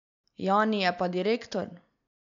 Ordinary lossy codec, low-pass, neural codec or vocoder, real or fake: none; 7.2 kHz; none; real